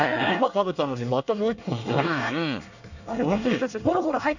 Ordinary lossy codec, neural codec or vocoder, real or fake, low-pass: none; codec, 24 kHz, 1 kbps, SNAC; fake; 7.2 kHz